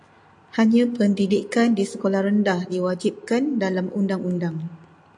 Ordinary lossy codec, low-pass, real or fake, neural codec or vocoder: AAC, 64 kbps; 10.8 kHz; real; none